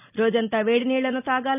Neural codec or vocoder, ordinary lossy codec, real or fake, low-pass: none; none; real; 3.6 kHz